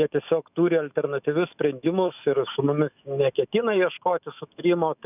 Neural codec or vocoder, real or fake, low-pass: autoencoder, 48 kHz, 128 numbers a frame, DAC-VAE, trained on Japanese speech; fake; 3.6 kHz